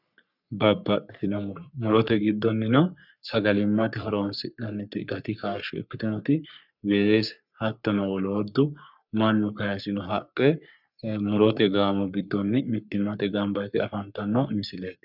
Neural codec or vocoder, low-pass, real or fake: codec, 44.1 kHz, 3.4 kbps, Pupu-Codec; 5.4 kHz; fake